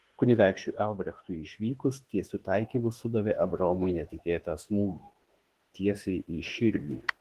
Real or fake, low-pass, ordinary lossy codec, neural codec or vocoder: fake; 14.4 kHz; Opus, 16 kbps; autoencoder, 48 kHz, 32 numbers a frame, DAC-VAE, trained on Japanese speech